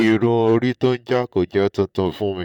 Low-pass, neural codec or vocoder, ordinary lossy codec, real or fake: 19.8 kHz; vocoder, 44.1 kHz, 128 mel bands, Pupu-Vocoder; none; fake